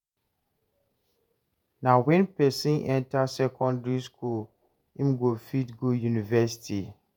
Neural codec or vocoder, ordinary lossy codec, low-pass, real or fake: vocoder, 48 kHz, 128 mel bands, Vocos; none; none; fake